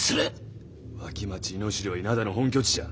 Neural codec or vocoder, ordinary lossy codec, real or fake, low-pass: none; none; real; none